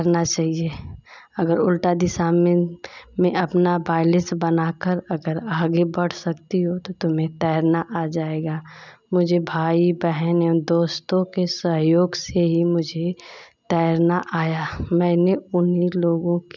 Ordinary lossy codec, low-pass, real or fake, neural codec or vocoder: none; 7.2 kHz; real; none